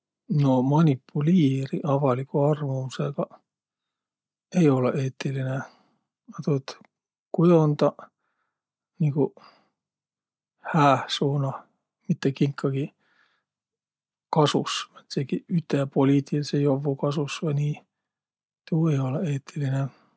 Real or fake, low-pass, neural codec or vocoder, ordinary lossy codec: real; none; none; none